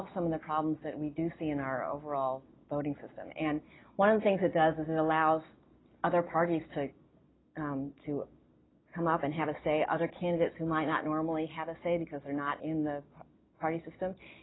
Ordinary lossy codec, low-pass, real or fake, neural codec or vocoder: AAC, 16 kbps; 7.2 kHz; real; none